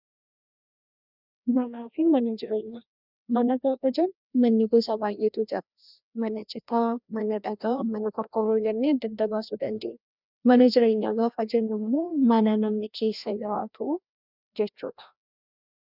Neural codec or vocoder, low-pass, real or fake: codec, 16 kHz, 1 kbps, FreqCodec, larger model; 5.4 kHz; fake